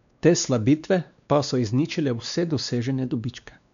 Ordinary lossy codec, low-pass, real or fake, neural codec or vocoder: none; 7.2 kHz; fake; codec, 16 kHz, 2 kbps, X-Codec, WavLM features, trained on Multilingual LibriSpeech